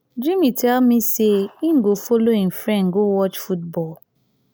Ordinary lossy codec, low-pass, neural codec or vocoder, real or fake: none; none; none; real